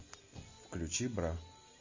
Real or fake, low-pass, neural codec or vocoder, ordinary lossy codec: real; 7.2 kHz; none; MP3, 32 kbps